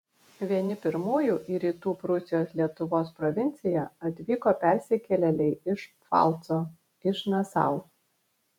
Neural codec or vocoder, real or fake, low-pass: vocoder, 48 kHz, 128 mel bands, Vocos; fake; 19.8 kHz